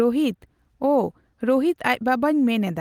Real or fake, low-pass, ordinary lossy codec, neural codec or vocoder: real; 19.8 kHz; Opus, 24 kbps; none